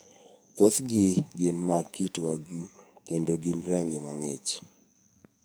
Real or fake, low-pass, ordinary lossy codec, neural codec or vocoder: fake; none; none; codec, 44.1 kHz, 2.6 kbps, SNAC